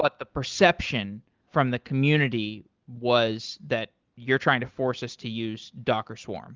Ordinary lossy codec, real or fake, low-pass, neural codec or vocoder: Opus, 32 kbps; real; 7.2 kHz; none